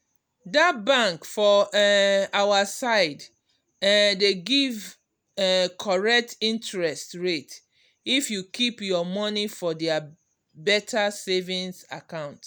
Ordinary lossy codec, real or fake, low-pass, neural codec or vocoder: none; real; none; none